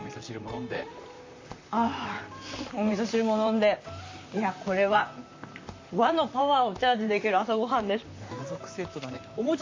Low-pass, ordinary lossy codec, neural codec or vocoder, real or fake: 7.2 kHz; MP3, 64 kbps; vocoder, 44.1 kHz, 128 mel bands, Pupu-Vocoder; fake